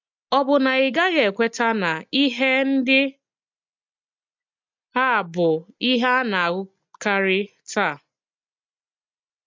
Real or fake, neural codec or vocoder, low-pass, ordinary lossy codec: real; none; 7.2 kHz; MP3, 64 kbps